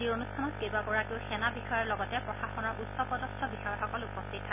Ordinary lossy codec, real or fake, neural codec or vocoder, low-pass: none; real; none; 3.6 kHz